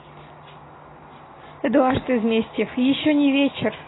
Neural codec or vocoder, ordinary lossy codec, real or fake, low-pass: none; AAC, 16 kbps; real; 7.2 kHz